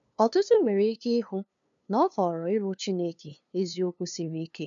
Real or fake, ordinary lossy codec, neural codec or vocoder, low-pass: fake; MP3, 96 kbps; codec, 16 kHz, 2 kbps, FunCodec, trained on LibriTTS, 25 frames a second; 7.2 kHz